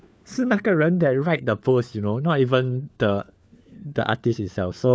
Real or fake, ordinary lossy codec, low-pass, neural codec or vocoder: fake; none; none; codec, 16 kHz, 4 kbps, FunCodec, trained on LibriTTS, 50 frames a second